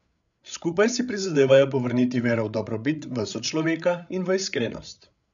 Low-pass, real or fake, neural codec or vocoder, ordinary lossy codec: 7.2 kHz; fake; codec, 16 kHz, 8 kbps, FreqCodec, larger model; none